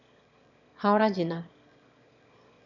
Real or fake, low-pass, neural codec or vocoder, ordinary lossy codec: fake; 7.2 kHz; autoencoder, 22.05 kHz, a latent of 192 numbers a frame, VITS, trained on one speaker; none